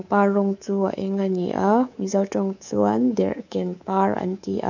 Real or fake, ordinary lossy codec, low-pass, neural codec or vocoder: fake; none; 7.2 kHz; codec, 24 kHz, 3.1 kbps, DualCodec